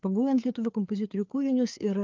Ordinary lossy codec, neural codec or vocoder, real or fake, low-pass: Opus, 32 kbps; codec, 16 kHz, 4 kbps, FreqCodec, larger model; fake; 7.2 kHz